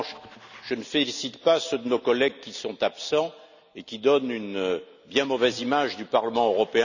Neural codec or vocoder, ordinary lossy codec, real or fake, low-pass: none; none; real; 7.2 kHz